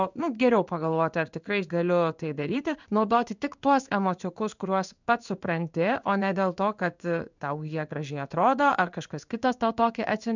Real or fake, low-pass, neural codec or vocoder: fake; 7.2 kHz; codec, 16 kHz in and 24 kHz out, 1 kbps, XY-Tokenizer